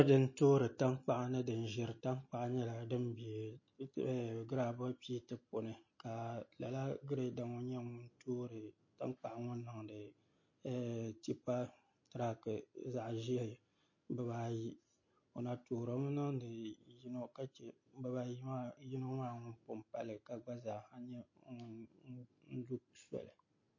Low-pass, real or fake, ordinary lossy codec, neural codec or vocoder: 7.2 kHz; real; MP3, 32 kbps; none